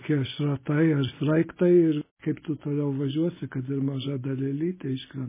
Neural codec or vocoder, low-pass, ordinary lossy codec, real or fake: none; 3.6 kHz; MP3, 16 kbps; real